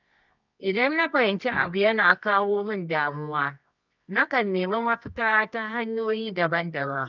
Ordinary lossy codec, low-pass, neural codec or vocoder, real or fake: none; 7.2 kHz; codec, 24 kHz, 0.9 kbps, WavTokenizer, medium music audio release; fake